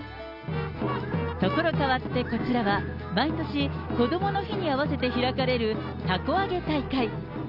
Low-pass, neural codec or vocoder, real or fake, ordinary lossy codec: 5.4 kHz; none; real; none